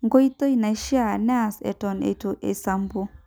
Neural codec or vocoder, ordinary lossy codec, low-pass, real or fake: none; none; none; real